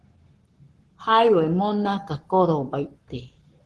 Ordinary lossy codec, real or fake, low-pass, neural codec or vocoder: Opus, 16 kbps; fake; 10.8 kHz; codec, 44.1 kHz, 7.8 kbps, Pupu-Codec